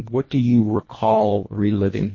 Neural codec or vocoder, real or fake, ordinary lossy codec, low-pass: codec, 24 kHz, 1.5 kbps, HILCodec; fake; MP3, 32 kbps; 7.2 kHz